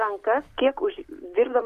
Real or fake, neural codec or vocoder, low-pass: real; none; 14.4 kHz